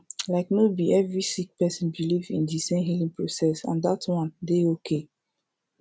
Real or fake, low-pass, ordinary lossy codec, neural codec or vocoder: real; none; none; none